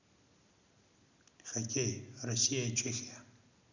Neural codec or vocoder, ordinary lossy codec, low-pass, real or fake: none; none; 7.2 kHz; real